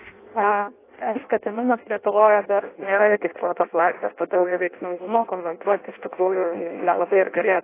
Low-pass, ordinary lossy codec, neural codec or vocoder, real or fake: 3.6 kHz; AAC, 24 kbps; codec, 16 kHz in and 24 kHz out, 0.6 kbps, FireRedTTS-2 codec; fake